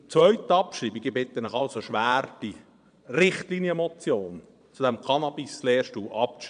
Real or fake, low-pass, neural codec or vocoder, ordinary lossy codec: fake; 9.9 kHz; vocoder, 22.05 kHz, 80 mel bands, Vocos; none